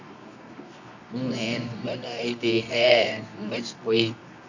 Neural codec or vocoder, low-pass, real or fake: codec, 24 kHz, 0.9 kbps, WavTokenizer, medium music audio release; 7.2 kHz; fake